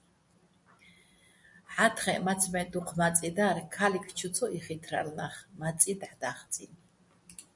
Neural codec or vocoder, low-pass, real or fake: none; 10.8 kHz; real